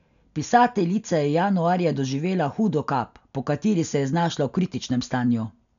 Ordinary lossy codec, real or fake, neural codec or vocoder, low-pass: none; real; none; 7.2 kHz